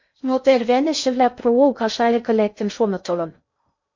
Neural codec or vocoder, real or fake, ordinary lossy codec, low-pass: codec, 16 kHz in and 24 kHz out, 0.6 kbps, FocalCodec, streaming, 2048 codes; fake; MP3, 48 kbps; 7.2 kHz